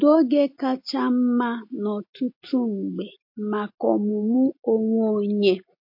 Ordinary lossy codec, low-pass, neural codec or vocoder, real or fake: MP3, 32 kbps; 5.4 kHz; none; real